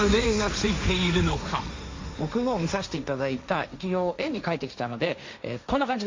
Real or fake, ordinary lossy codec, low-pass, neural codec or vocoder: fake; MP3, 48 kbps; 7.2 kHz; codec, 16 kHz, 1.1 kbps, Voila-Tokenizer